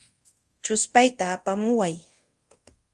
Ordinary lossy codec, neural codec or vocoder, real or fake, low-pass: Opus, 32 kbps; codec, 24 kHz, 0.5 kbps, DualCodec; fake; 10.8 kHz